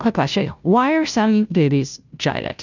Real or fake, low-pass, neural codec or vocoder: fake; 7.2 kHz; codec, 16 kHz, 0.5 kbps, FunCodec, trained on Chinese and English, 25 frames a second